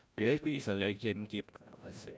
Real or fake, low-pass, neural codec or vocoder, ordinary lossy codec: fake; none; codec, 16 kHz, 0.5 kbps, FreqCodec, larger model; none